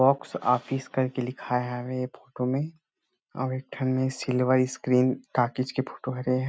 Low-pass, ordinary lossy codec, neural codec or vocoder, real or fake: none; none; none; real